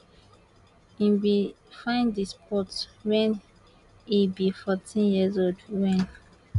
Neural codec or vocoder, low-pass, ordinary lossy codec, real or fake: none; 10.8 kHz; none; real